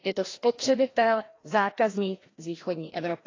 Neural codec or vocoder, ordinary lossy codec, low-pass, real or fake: codec, 16 kHz, 1 kbps, FreqCodec, larger model; AAC, 32 kbps; 7.2 kHz; fake